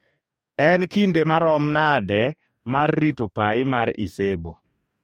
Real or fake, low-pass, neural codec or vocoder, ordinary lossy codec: fake; 19.8 kHz; codec, 44.1 kHz, 2.6 kbps, DAC; MP3, 64 kbps